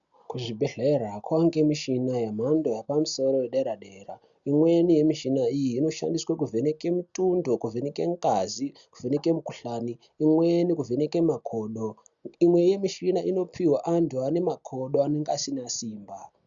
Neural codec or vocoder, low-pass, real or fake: none; 7.2 kHz; real